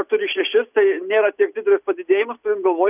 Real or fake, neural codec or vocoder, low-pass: real; none; 3.6 kHz